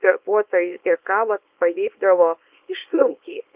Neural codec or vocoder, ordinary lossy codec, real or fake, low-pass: codec, 24 kHz, 0.9 kbps, WavTokenizer, small release; Opus, 32 kbps; fake; 3.6 kHz